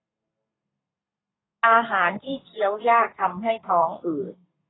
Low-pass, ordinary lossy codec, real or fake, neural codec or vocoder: 7.2 kHz; AAC, 16 kbps; fake; codec, 32 kHz, 1.9 kbps, SNAC